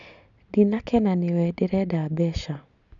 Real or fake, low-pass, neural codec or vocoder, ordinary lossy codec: real; 7.2 kHz; none; none